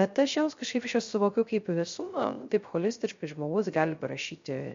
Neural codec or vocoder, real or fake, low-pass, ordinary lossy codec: codec, 16 kHz, 0.3 kbps, FocalCodec; fake; 7.2 kHz; MP3, 48 kbps